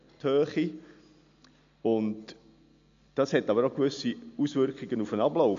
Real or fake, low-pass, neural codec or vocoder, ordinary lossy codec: real; 7.2 kHz; none; MP3, 64 kbps